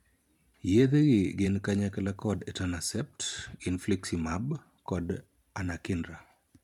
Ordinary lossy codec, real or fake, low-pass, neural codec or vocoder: none; fake; 19.8 kHz; vocoder, 44.1 kHz, 128 mel bands every 512 samples, BigVGAN v2